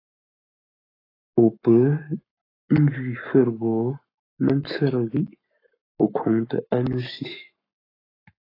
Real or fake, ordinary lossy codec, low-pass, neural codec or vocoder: fake; AAC, 24 kbps; 5.4 kHz; codec, 44.1 kHz, 7.8 kbps, Pupu-Codec